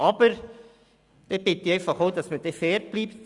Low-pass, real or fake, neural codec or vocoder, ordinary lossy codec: 10.8 kHz; real; none; Opus, 64 kbps